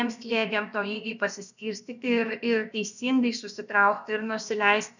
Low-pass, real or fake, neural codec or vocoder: 7.2 kHz; fake; codec, 16 kHz, about 1 kbps, DyCAST, with the encoder's durations